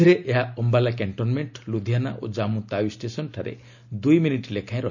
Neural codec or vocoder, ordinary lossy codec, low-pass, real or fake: none; none; 7.2 kHz; real